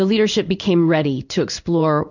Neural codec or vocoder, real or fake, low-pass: codec, 16 kHz in and 24 kHz out, 1 kbps, XY-Tokenizer; fake; 7.2 kHz